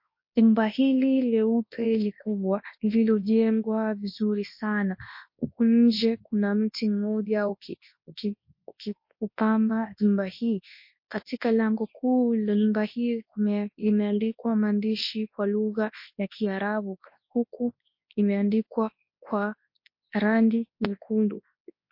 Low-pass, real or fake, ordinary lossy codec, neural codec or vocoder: 5.4 kHz; fake; MP3, 32 kbps; codec, 24 kHz, 0.9 kbps, WavTokenizer, large speech release